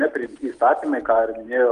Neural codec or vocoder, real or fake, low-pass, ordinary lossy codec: none; real; 10.8 kHz; Opus, 32 kbps